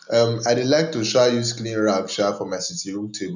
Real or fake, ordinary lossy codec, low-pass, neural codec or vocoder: real; none; 7.2 kHz; none